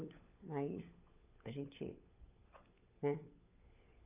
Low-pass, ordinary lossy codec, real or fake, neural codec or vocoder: 3.6 kHz; AAC, 24 kbps; fake; codec, 16 kHz, 16 kbps, FunCodec, trained on LibriTTS, 50 frames a second